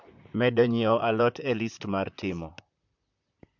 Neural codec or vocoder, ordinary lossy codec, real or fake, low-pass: vocoder, 44.1 kHz, 128 mel bands, Pupu-Vocoder; MP3, 64 kbps; fake; 7.2 kHz